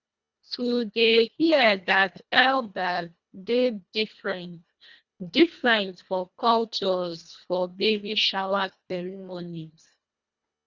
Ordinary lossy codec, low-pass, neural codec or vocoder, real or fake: Opus, 64 kbps; 7.2 kHz; codec, 24 kHz, 1.5 kbps, HILCodec; fake